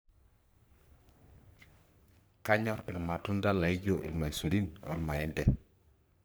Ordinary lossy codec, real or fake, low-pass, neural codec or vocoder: none; fake; none; codec, 44.1 kHz, 3.4 kbps, Pupu-Codec